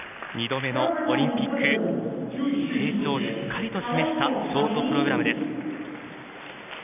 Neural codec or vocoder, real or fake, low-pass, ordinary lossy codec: none; real; 3.6 kHz; none